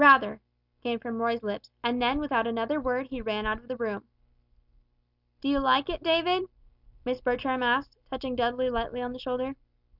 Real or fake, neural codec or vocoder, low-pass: real; none; 5.4 kHz